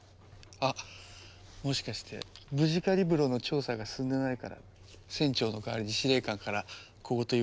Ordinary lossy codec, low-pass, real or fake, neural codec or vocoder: none; none; real; none